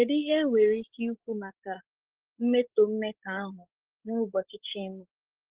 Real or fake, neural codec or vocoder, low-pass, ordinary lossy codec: fake; codec, 16 kHz, 16 kbps, FreqCodec, larger model; 3.6 kHz; Opus, 16 kbps